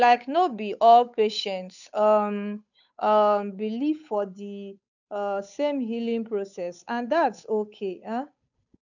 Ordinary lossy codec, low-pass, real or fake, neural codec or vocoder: none; 7.2 kHz; fake; codec, 16 kHz, 8 kbps, FunCodec, trained on Chinese and English, 25 frames a second